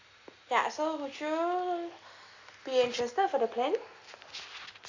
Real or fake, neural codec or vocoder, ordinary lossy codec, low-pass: real; none; AAC, 48 kbps; 7.2 kHz